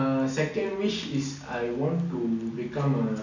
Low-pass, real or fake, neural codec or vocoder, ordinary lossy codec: 7.2 kHz; real; none; none